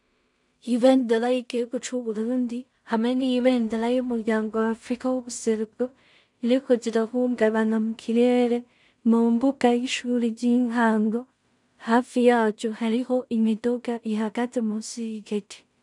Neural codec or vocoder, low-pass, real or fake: codec, 16 kHz in and 24 kHz out, 0.4 kbps, LongCat-Audio-Codec, two codebook decoder; 10.8 kHz; fake